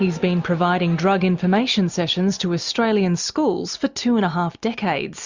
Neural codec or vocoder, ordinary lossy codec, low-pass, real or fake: none; Opus, 64 kbps; 7.2 kHz; real